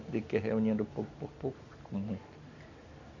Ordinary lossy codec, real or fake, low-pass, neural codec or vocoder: none; real; 7.2 kHz; none